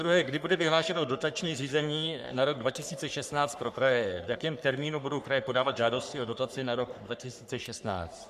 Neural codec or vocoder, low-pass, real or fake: codec, 44.1 kHz, 3.4 kbps, Pupu-Codec; 14.4 kHz; fake